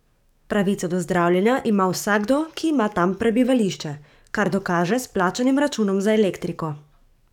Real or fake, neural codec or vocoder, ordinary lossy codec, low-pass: fake; codec, 44.1 kHz, 7.8 kbps, DAC; none; 19.8 kHz